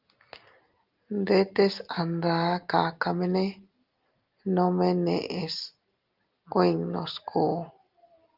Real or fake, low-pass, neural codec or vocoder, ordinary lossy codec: real; 5.4 kHz; none; Opus, 32 kbps